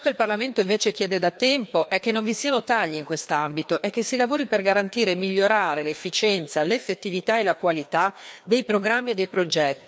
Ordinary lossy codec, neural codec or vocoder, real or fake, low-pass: none; codec, 16 kHz, 2 kbps, FreqCodec, larger model; fake; none